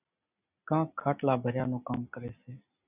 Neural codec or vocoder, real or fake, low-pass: none; real; 3.6 kHz